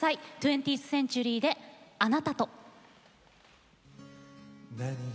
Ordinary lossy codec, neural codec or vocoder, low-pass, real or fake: none; none; none; real